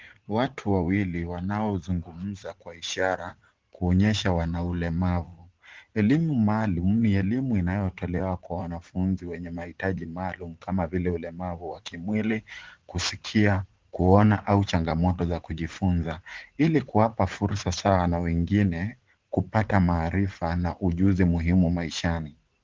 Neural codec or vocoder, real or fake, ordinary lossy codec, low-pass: vocoder, 44.1 kHz, 80 mel bands, Vocos; fake; Opus, 16 kbps; 7.2 kHz